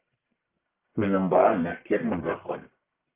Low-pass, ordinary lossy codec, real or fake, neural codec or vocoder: 3.6 kHz; Opus, 24 kbps; fake; codec, 44.1 kHz, 1.7 kbps, Pupu-Codec